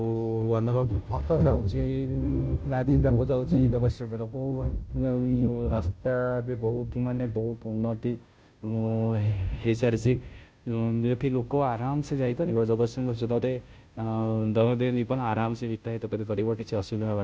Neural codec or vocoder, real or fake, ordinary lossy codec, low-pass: codec, 16 kHz, 0.5 kbps, FunCodec, trained on Chinese and English, 25 frames a second; fake; none; none